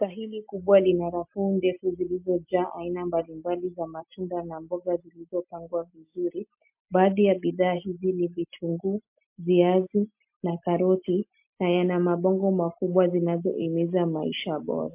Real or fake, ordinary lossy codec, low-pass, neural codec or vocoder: real; MP3, 32 kbps; 3.6 kHz; none